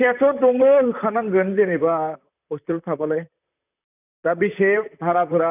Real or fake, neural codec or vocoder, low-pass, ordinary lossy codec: fake; vocoder, 44.1 kHz, 128 mel bands, Pupu-Vocoder; 3.6 kHz; none